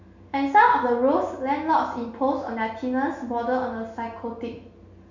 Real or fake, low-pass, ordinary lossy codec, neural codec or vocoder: real; 7.2 kHz; none; none